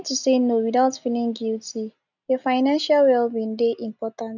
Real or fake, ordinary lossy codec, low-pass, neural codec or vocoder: real; none; 7.2 kHz; none